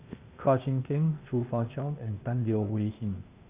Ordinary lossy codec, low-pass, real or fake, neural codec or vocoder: Opus, 64 kbps; 3.6 kHz; fake; codec, 16 kHz, 0.8 kbps, ZipCodec